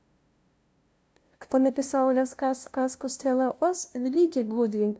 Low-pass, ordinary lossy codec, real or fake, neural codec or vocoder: none; none; fake; codec, 16 kHz, 0.5 kbps, FunCodec, trained on LibriTTS, 25 frames a second